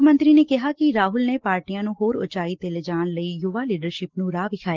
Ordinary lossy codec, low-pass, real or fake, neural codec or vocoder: Opus, 16 kbps; 7.2 kHz; real; none